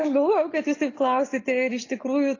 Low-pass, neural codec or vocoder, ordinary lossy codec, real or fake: 7.2 kHz; none; AAC, 32 kbps; real